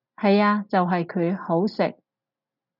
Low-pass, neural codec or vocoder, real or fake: 5.4 kHz; none; real